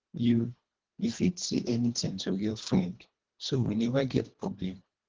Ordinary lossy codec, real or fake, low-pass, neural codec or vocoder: Opus, 16 kbps; fake; 7.2 kHz; codec, 24 kHz, 1.5 kbps, HILCodec